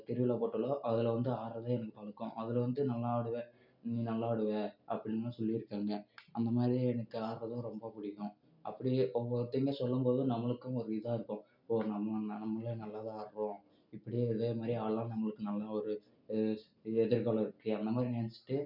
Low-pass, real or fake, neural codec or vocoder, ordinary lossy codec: 5.4 kHz; real; none; none